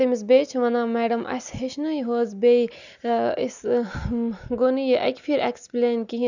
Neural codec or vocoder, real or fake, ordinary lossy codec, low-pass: none; real; none; 7.2 kHz